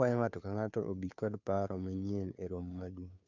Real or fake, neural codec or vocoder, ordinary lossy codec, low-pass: fake; codec, 16 kHz, 2 kbps, FunCodec, trained on Chinese and English, 25 frames a second; none; 7.2 kHz